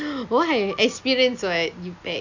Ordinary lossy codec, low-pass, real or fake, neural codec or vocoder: none; 7.2 kHz; real; none